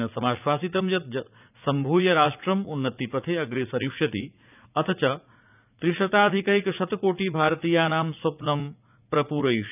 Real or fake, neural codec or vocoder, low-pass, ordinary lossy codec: fake; vocoder, 44.1 kHz, 80 mel bands, Vocos; 3.6 kHz; none